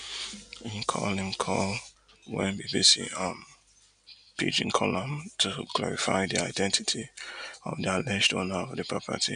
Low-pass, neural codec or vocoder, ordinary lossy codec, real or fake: 9.9 kHz; none; none; real